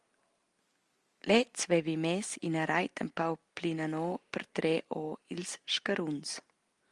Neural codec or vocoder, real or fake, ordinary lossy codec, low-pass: none; real; Opus, 32 kbps; 10.8 kHz